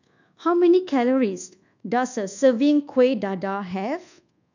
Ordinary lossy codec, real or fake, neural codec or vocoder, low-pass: none; fake; codec, 24 kHz, 1.2 kbps, DualCodec; 7.2 kHz